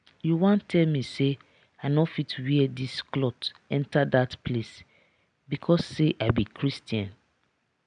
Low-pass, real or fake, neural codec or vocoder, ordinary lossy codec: 9.9 kHz; real; none; none